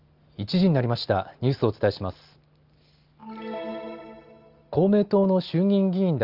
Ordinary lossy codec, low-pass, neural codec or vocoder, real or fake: Opus, 24 kbps; 5.4 kHz; none; real